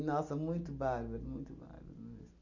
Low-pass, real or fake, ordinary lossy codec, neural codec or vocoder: 7.2 kHz; real; none; none